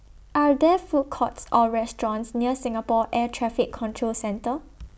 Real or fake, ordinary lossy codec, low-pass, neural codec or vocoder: real; none; none; none